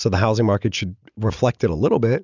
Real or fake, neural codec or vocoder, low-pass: real; none; 7.2 kHz